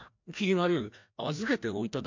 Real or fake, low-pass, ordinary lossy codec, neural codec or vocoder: fake; 7.2 kHz; MP3, 48 kbps; codec, 16 kHz, 1 kbps, FreqCodec, larger model